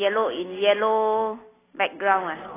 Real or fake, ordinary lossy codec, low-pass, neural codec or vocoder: real; AAC, 16 kbps; 3.6 kHz; none